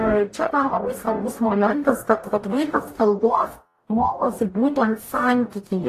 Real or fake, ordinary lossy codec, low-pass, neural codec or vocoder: fake; AAC, 48 kbps; 14.4 kHz; codec, 44.1 kHz, 0.9 kbps, DAC